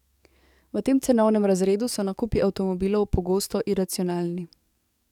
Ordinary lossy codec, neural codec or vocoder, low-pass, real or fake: none; codec, 44.1 kHz, 7.8 kbps, DAC; 19.8 kHz; fake